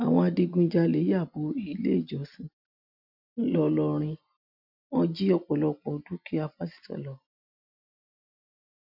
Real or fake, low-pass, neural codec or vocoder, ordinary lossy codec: real; 5.4 kHz; none; none